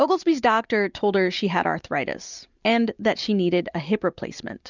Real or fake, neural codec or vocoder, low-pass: real; none; 7.2 kHz